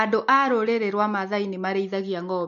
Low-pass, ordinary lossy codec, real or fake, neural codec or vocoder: 7.2 kHz; MP3, 48 kbps; real; none